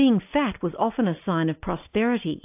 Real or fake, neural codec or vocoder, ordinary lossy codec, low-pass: real; none; AAC, 32 kbps; 3.6 kHz